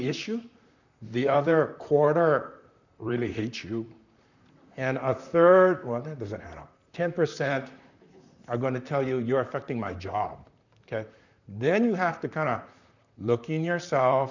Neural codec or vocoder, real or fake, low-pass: vocoder, 44.1 kHz, 128 mel bands, Pupu-Vocoder; fake; 7.2 kHz